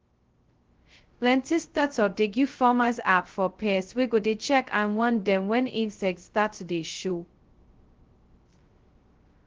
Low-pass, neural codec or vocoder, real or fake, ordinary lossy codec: 7.2 kHz; codec, 16 kHz, 0.2 kbps, FocalCodec; fake; Opus, 16 kbps